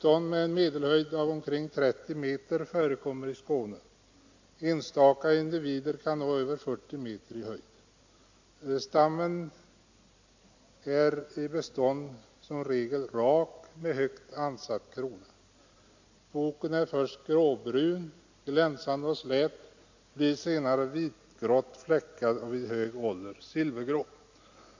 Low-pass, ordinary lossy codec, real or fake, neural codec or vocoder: 7.2 kHz; AAC, 48 kbps; real; none